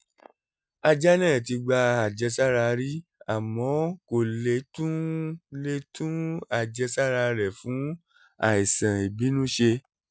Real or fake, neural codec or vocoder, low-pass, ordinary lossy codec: real; none; none; none